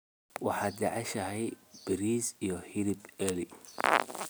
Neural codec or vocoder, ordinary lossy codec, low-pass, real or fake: none; none; none; real